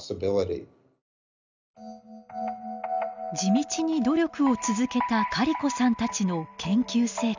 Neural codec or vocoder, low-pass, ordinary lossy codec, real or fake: none; 7.2 kHz; none; real